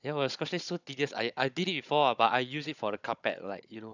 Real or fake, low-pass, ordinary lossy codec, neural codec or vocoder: fake; 7.2 kHz; none; codec, 16 kHz, 16 kbps, FunCodec, trained on Chinese and English, 50 frames a second